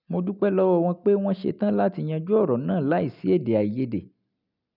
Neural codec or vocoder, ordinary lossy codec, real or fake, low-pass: none; none; real; 5.4 kHz